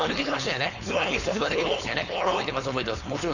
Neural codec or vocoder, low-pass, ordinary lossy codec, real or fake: codec, 16 kHz, 4.8 kbps, FACodec; 7.2 kHz; none; fake